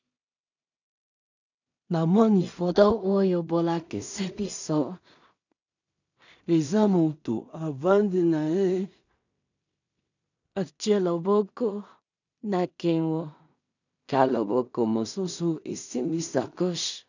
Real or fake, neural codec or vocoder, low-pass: fake; codec, 16 kHz in and 24 kHz out, 0.4 kbps, LongCat-Audio-Codec, two codebook decoder; 7.2 kHz